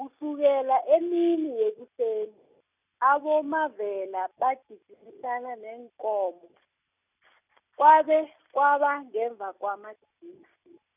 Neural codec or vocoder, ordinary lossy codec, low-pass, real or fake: none; none; 3.6 kHz; real